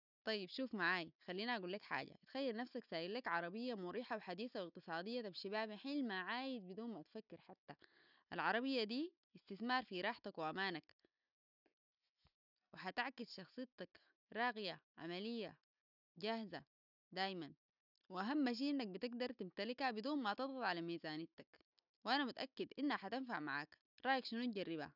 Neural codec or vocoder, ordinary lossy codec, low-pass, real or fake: none; none; 5.4 kHz; real